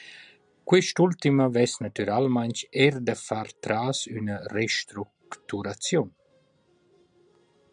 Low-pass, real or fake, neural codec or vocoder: 9.9 kHz; real; none